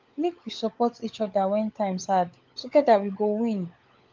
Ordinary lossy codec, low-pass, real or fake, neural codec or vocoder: Opus, 24 kbps; 7.2 kHz; fake; codec, 16 kHz, 16 kbps, FunCodec, trained on LibriTTS, 50 frames a second